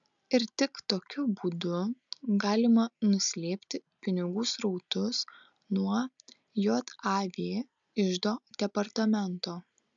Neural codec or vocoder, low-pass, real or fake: none; 7.2 kHz; real